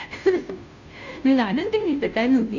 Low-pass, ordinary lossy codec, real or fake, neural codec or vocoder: 7.2 kHz; none; fake; codec, 16 kHz, 0.5 kbps, FunCodec, trained on Chinese and English, 25 frames a second